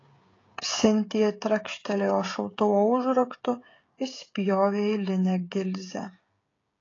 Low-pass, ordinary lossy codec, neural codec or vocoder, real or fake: 7.2 kHz; AAC, 32 kbps; codec, 16 kHz, 16 kbps, FreqCodec, smaller model; fake